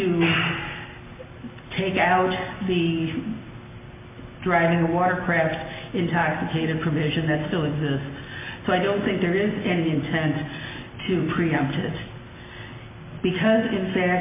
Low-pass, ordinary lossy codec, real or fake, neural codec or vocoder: 3.6 kHz; MP3, 24 kbps; real; none